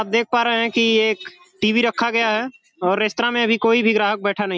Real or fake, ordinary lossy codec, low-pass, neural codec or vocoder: real; none; none; none